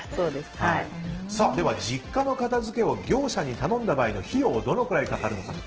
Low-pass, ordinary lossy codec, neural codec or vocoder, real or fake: 7.2 kHz; Opus, 16 kbps; none; real